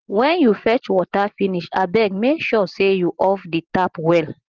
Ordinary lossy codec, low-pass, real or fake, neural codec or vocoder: Opus, 16 kbps; 7.2 kHz; real; none